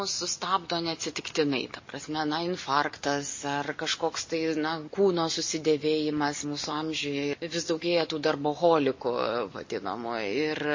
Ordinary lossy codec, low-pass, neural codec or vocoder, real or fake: MP3, 32 kbps; 7.2 kHz; none; real